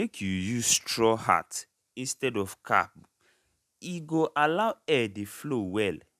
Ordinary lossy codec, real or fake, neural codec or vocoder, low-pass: none; real; none; 14.4 kHz